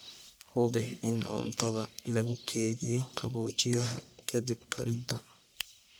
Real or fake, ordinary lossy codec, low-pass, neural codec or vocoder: fake; none; none; codec, 44.1 kHz, 1.7 kbps, Pupu-Codec